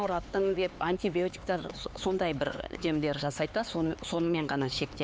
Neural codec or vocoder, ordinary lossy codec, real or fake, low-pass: codec, 16 kHz, 4 kbps, X-Codec, HuBERT features, trained on LibriSpeech; none; fake; none